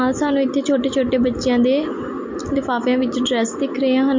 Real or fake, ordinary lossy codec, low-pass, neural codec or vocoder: real; MP3, 48 kbps; 7.2 kHz; none